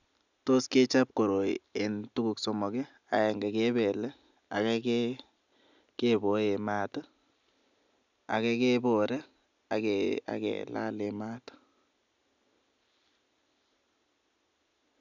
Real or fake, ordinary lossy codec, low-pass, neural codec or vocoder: real; none; 7.2 kHz; none